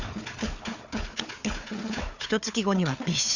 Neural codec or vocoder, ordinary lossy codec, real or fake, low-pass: codec, 16 kHz, 4 kbps, FunCodec, trained on Chinese and English, 50 frames a second; none; fake; 7.2 kHz